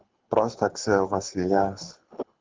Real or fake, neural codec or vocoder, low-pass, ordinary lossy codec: fake; codec, 24 kHz, 6 kbps, HILCodec; 7.2 kHz; Opus, 32 kbps